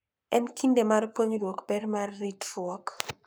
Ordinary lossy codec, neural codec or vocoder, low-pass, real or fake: none; codec, 44.1 kHz, 7.8 kbps, Pupu-Codec; none; fake